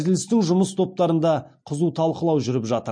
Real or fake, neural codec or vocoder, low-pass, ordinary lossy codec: real; none; 9.9 kHz; MP3, 48 kbps